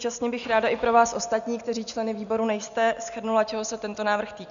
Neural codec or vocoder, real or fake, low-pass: none; real; 7.2 kHz